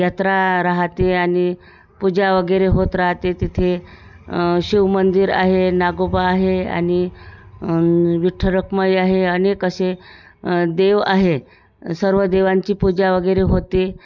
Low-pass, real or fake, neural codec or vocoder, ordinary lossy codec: 7.2 kHz; real; none; none